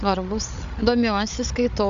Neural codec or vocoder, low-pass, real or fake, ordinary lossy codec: codec, 16 kHz, 16 kbps, FreqCodec, larger model; 7.2 kHz; fake; MP3, 64 kbps